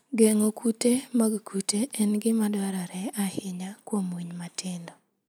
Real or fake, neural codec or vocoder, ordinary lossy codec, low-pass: real; none; none; none